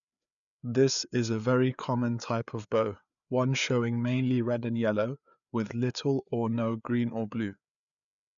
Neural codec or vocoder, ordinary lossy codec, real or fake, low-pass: codec, 16 kHz, 4 kbps, FreqCodec, larger model; none; fake; 7.2 kHz